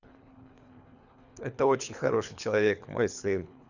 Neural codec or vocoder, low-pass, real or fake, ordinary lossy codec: codec, 24 kHz, 3 kbps, HILCodec; 7.2 kHz; fake; none